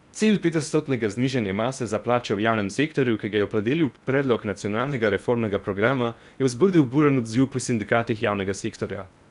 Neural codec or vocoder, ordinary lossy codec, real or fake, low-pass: codec, 16 kHz in and 24 kHz out, 0.8 kbps, FocalCodec, streaming, 65536 codes; none; fake; 10.8 kHz